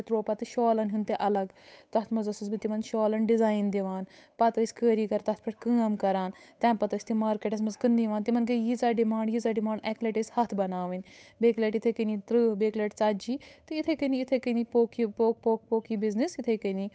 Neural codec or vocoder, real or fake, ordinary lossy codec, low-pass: codec, 16 kHz, 8 kbps, FunCodec, trained on Chinese and English, 25 frames a second; fake; none; none